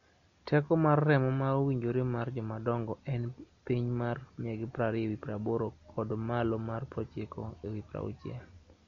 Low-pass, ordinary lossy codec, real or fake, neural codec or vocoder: 7.2 kHz; MP3, 48 kbps; real; none